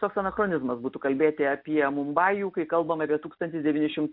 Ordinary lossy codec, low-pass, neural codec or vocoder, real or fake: MP3, 48 kbps; 5.4 kHz; none; real